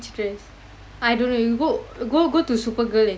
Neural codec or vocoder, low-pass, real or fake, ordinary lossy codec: none; none; real; none